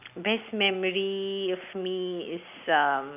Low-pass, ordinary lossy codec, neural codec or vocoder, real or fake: 3.6 kHz; none; none; real